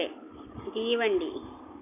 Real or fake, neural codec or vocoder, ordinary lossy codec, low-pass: real; none; none; 3.6 kHz